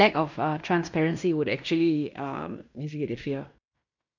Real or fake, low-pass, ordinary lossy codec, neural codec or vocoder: fake; 7.2 kHz; none; codec, 16 kHz in and 24 kHz out, 0.9 kbps, LongCat-Audio-Codec, fine tuned four codebook decoder